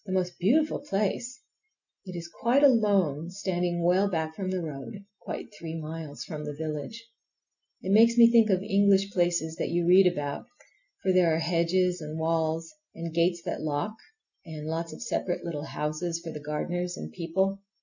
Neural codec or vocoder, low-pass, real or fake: none; 7.2 kHz; real